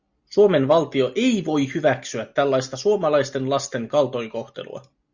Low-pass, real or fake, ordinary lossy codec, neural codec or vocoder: 7.2 kHz; real; Opus, 64 kbps; none